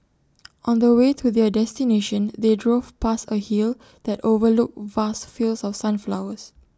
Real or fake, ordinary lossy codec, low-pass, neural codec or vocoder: real; none; none; none